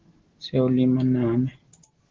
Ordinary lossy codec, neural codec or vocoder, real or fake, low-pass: Opus, 16 kbps; none; real; 7.2 kHz